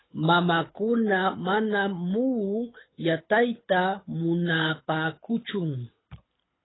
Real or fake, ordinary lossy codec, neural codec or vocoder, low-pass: fake; AAC, 16 kbps; codec, 24 kHz, 6 kbps, HILCodec; 7.2 kHz